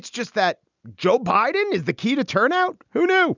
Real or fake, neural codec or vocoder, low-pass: real; none; 7.2 kHz